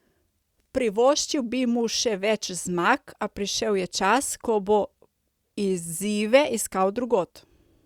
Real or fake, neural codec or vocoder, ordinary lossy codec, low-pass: real; none; Opus, 64 kbps; 19.8 kHz